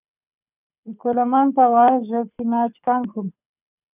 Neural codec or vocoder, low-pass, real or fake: codec, 24 kHz, 6 kbps, HILCodec; 3.6 kHz; fake